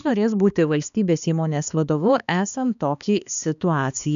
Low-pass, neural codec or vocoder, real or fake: 7.2 kHz; codec, 16 kHz, 2 kbps, X-Codec, HuBERT features, trained on balanced general audio; fake